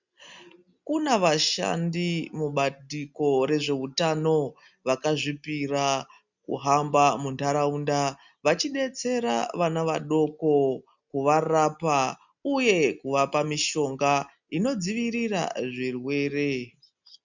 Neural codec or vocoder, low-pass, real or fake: none; 7.2 kHz; real